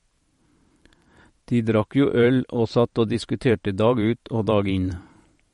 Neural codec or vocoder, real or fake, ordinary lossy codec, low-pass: vocoder, 44.1 kHz, 128 mel bands every 512 samples, BigVGAN v2; fake; MP3, 48 kbps; 19.8 kHz